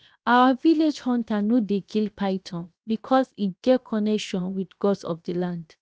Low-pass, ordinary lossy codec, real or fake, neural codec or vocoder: none; none; fake; codec, 16 kHz, 0.7 kbps, FocalCodec